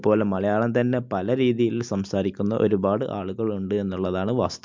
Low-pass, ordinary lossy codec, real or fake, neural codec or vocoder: 7.2 kHz; MP3, 64 kbps; real; none